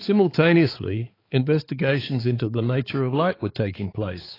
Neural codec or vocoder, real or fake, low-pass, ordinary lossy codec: codec, 16 kHz, 4 kbps, X-Codec, HuBERT features, trained on balanced general audio; fake; 5.4 kHz; AAC, 24 kbps